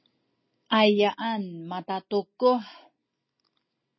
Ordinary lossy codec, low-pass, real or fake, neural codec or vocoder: MP3, 24 kbps; 7.2 kHz; real; none